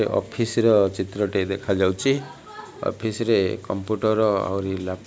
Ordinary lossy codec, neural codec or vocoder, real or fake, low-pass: none; none; real; none